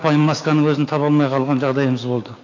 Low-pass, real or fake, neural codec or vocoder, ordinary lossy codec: 7.2 kHz; fake; codec, 16 kHz, 6 kbps, DAC; AAC, 32 kbps